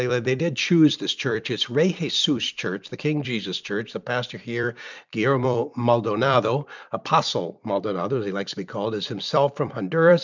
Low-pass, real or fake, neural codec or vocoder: 7.2 kHz; fake; vocoder, 44.1 kHz, 128 mel bands every 256 samples, BigVGAN v2